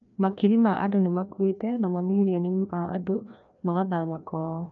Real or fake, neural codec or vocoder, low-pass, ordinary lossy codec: fake; codec, 16 kHz, 1 kbps, FreqCodec, larger model; 7.2 kHz; none